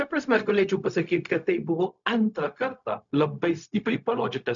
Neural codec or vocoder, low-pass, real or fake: codec, 16 kHz, 0.4 kbps, LongCat-Audio-Codec; 7.2 kHz; fake